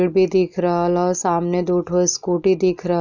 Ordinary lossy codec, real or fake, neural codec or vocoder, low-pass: none; real; none; 7.2 kHz